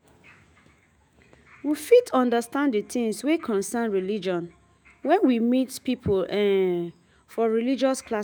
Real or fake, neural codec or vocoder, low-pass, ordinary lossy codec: fake; autoencoder, 48 kHz, 128 numbers a frame, DAC-VAE, trained on Japanese speech; none; none